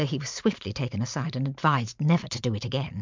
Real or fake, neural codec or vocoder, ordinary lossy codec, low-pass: fake; vocoder, 44.1 kHz, 128 mel bands every 512 samples, BigVGAN v2; MP3, 64 kbps; 7.2 kHz